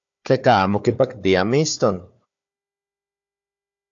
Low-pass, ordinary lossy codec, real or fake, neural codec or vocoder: 7.2 kHz; AAC, 64 kbps; fake; codec, 16 kHz, 4 kbps, FunCodec, trained on Chinese and English, 50 frames a second